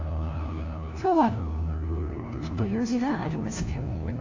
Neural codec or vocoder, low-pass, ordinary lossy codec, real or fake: codec, 16 kHz, 1 kbps, FunCodec, trained on LibriTTS, 50 frames a second; 7.2 kHz; AAC, 48 kbps; fake